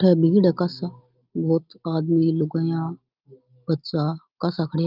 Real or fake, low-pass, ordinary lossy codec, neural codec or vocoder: real; 5.4 kHz; Opus, 32 kbps; none